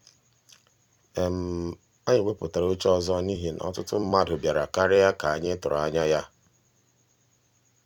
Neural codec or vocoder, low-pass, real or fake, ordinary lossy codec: vocoder, 44.1 kHz, 128 mel bands every 256 samples, BigVGAN v2; 19.8 kHz; fake; none